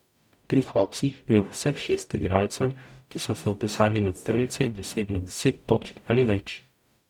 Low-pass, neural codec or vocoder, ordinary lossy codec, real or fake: 19.8 kHz; codec, 44.1 kHz, 0.9 kbps, DAC; none; fake